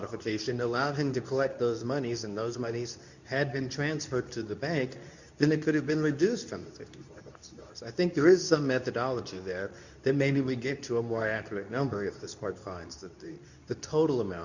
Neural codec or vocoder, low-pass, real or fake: codec, 24 kHz, 0.9 kbps, WavTokenizer, medium speech release version 2; 7.2 kHz; fake